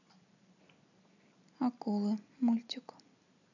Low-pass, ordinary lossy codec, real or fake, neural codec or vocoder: 7.2 kHz; none; fake; vocoder, 44.1 kHz, 128 mel bands every 256 samples, BigVGAN v2